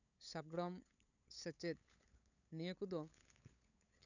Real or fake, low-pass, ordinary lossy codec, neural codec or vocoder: fake; 7.2 kHz; none; codec, 16 kHz, 8 kbps, FunCodec, trained on LibriTTS, 25 frames a second